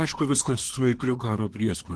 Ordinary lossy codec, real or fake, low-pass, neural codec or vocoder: Opus, 16 kbps; fake; 10.8 kHz; codec, 24 kHz, 1 kbps, SNAC